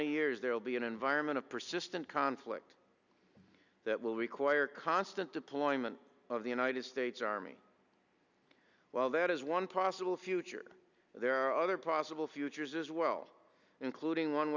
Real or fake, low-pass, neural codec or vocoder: real; 7.2 kHz; none